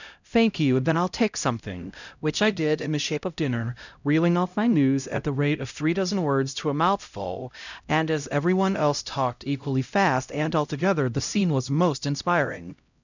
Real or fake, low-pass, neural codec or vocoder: fake; 7.2 kHz; codec, 16 kHz, 0.5 kbps, X-Codec, HuBERT features, trained on LibriSpeech